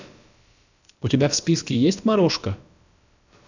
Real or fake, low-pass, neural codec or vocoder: fake; 7.2 kHz; codec, 16 kHz, about 1 kbps, DyCAST, with the encoder's durations